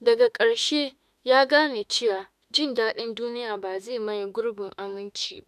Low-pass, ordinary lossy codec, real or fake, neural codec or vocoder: 14.4 kHz; none; fake; autoencoder, 48 kHz, 32 numbers a frame, DAC-VAE, trained on Japanese speech